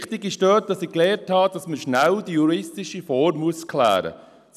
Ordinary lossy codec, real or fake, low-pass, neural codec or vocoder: none; real; 14.4 kHz; none